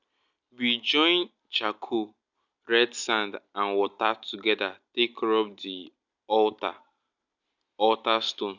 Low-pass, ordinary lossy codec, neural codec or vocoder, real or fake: 7.2 kHz; none; none; real